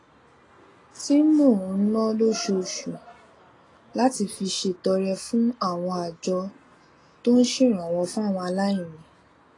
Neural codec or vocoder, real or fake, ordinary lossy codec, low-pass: none; real; AAC, 32 kbps; 10.8 kHz